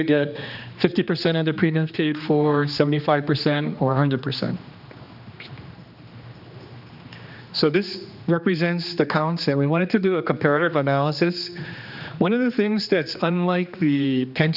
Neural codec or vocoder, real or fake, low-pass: codec, 16 kHz, 2 kbps, X-Codec, HuBERT features, trained on general audio; fake; 5.4 kHz